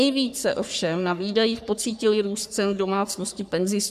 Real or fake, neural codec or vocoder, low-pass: fake; codec, 44.1 kHz, 3.4 kbps, Pupu-Codec; 14.4 kHz